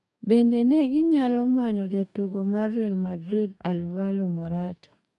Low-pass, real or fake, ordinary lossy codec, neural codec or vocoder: 10.8 kHz; fake; none; codec, 44.1 kHz, 2.6 kbps, DAC